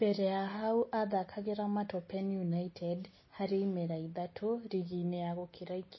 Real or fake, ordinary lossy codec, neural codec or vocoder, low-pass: real; MP3, 24 kbps; none; 7.2 kHz